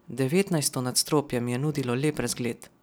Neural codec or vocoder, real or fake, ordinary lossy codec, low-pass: none; real; none; none